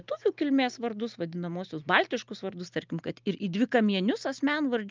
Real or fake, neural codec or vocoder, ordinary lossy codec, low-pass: real; none; Opus, 24 kbps; 7.2 kHz